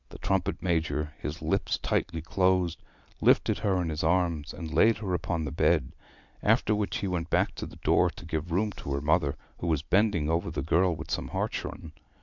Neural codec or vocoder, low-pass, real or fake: none; 7.2 kHz; real